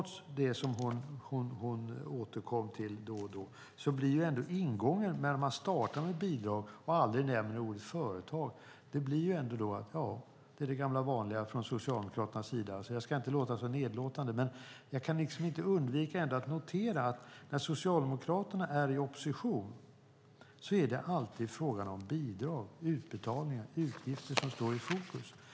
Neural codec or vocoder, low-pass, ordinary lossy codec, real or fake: none; none; none; real